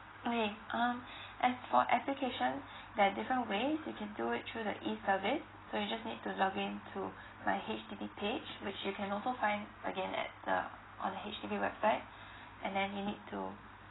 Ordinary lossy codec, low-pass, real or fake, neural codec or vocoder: AAC, 16 kbps; 7.2 kHz; real; none